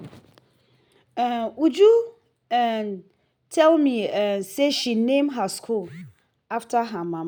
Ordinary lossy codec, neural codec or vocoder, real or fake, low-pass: none; none; real; none